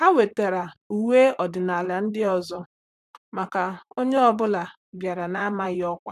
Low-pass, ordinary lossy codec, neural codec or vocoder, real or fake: 14.4 kHz; none; vocoder, 44.1 kHz, 128 mel bands, Pupu-Vocoder; fake